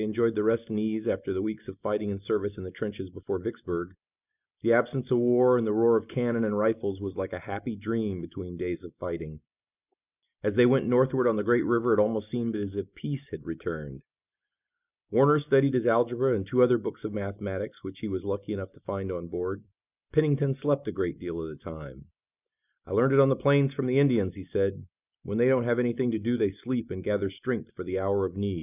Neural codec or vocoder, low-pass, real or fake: none; 3.6 kHz; real